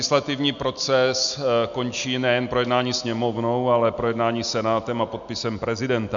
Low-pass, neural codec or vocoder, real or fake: 7.2 kHz; none; real